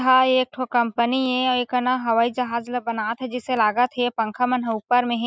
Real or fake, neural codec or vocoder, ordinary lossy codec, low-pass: real; none; none; none